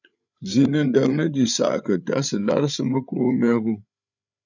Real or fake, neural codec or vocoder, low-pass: fake; codec, 16 kHz, 8 kbps, FreqCodec, larger model; 7.2 kHz